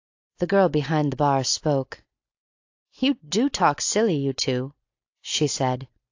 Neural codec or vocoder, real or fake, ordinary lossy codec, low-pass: none; real; AAC, 48 kbps; 7.2 kHz